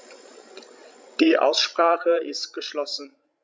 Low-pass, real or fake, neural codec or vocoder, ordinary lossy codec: none; fake; codec, 16 kHz, 16 kbps, FreqCodec, larger model; none